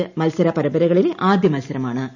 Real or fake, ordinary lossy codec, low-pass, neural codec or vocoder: real; none; 7.2 kHz; none